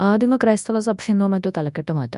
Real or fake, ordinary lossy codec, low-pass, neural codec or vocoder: fake; none; 10.8 kHz; codec, 24 kHz, 0.9 kbps, WavTokenizer, large speech release